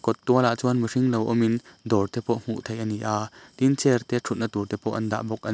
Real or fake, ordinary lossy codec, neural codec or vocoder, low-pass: real; none; none; none